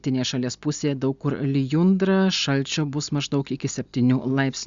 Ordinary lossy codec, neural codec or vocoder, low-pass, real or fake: Opus, 64 kbps; none; 7.2 kHz; real